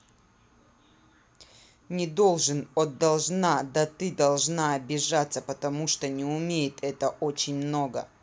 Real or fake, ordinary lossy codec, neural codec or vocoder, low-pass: real; none; none; none